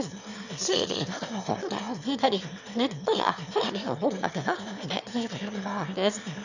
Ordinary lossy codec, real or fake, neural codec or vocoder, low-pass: none; fake; autoencoder, 22.05 kHz, a latent of 192 numbers a frame, VITS, trained on one speaker; 7.2 kHz